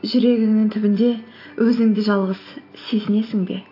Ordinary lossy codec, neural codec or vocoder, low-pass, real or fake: AAC, 32 kbps; none; 5.4 kHz; real